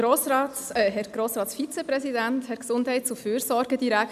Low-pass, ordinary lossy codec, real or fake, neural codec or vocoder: 14.4 kHz; none; real; none